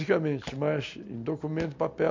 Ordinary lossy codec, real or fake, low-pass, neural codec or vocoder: MP3, 48 kbps; real; 7.2 kHz; none